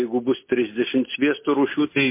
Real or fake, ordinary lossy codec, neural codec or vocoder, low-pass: real; MP3, 24 kbps; none; 3.6 kHz